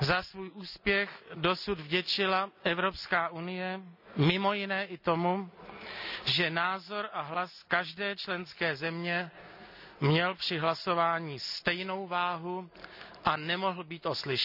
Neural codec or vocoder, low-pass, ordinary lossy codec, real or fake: none; 5.4 kHz; none; real